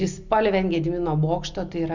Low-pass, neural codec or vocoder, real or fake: 7.2 kHz; none; real